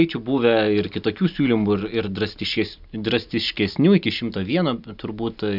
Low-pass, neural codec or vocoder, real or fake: 5.4 kHz; none; real